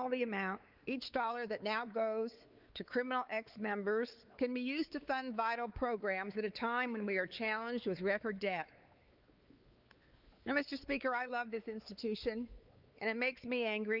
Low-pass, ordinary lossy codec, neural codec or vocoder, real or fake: 5.4 kHz; Opus, 32 kbps; codec, 16 kHz, 4 kbps, X-Codec, WavLM features, trained on Multilingual LibriSpeech; fake